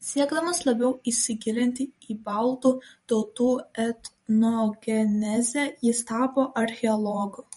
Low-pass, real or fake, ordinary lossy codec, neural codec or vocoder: 19.8 kHz; fake; MP3, 48 kbps; vocoder, 44.1 kHz, 128 mel bands every 512 samples, BigVGAN v2